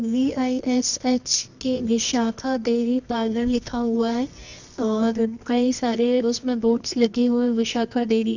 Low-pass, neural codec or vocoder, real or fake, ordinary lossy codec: 7.2 kHz; codec, 24 kHz, 0.9 kbps, WavTokenizer, medium music audio release; fake; none